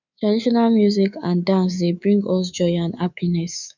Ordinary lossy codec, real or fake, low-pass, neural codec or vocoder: none; fake; 7.2 kHz; codec, 24 kHz, 3.1 kbps, DualCodec